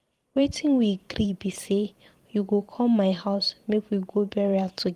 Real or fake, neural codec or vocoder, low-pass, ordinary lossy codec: fake; vocoder, 44.1 kHz, 128 mel bands every 512 samples, BigVGAN v2; 14.4 kHz; Opus, 24 kbps